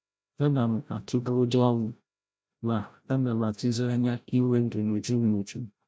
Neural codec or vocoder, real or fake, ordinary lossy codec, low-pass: codec, 16 kHz, 0.5 kbps, FreqCodec, larger model; fake; none; none